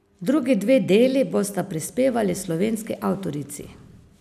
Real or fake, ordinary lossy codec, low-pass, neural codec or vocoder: real; none; 14.4 kHz; none